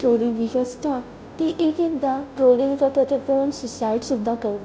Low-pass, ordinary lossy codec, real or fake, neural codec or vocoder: none; none; fake; codec, 16 kHz, 0.5 kbps, FunCodec, trained on Chinese and English, 25 frames a second